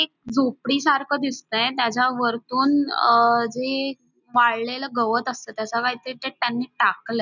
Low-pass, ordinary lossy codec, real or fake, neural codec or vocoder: 7.2 kHz; none; real; none